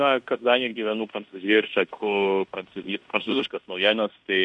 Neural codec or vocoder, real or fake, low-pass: codec, 16 kHz in and 24 kHz out, 0.9 kbps, LongCat-Audio-Codec, fine tuned four codebook decoder; fake; 10.8 kHz